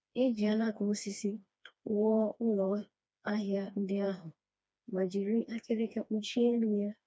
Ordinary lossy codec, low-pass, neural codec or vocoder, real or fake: none; none; codec, 16 kHz, 2 kbps, FreqCodec, smaller model; fake